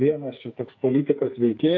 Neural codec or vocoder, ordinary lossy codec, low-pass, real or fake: codec, 44.1 kHz, 2.6 kbps, SNAC; AAC, 48 kbps; 7.2 kHz; fake